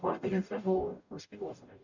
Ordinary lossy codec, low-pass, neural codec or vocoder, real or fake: none; 7.2 kHz; codec, 44.1 kHz, 0.9 kbps, DAC; fake